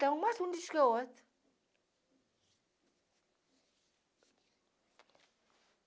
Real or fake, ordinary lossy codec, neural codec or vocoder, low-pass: real; none; none; none